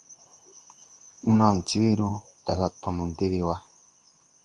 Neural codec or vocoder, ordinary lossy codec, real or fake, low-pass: codec, 24 kHz, 0.9 kbps, WavTokenizer, medium speech release version 2; Opus, 32 kbps; fake; 10.8 kHz